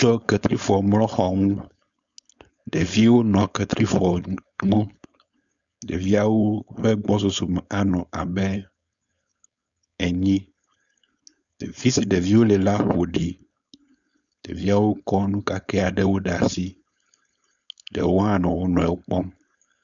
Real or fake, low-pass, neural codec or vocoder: fake; 7.2 kHz; codec, 16 kHz, 4.8 kbps, FACodec